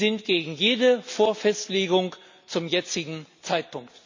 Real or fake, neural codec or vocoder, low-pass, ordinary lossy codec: real; none; 7.2 kHz; MP3, 64 kbps